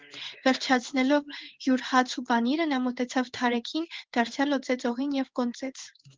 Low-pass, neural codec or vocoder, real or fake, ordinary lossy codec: 7.2 kHz; codec, 16 kHz in and 24 kHz out, 1 kbps, XY-Tokenizer; fake; Opus, 16 kbps